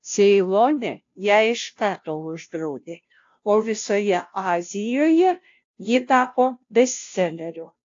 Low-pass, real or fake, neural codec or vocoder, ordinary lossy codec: 7.2 kHz; fake; codec, 16 kHz, 0.5 kbps, FunCodec, trained on Chinese and English, 25 frames a second; AAC, 48 kbps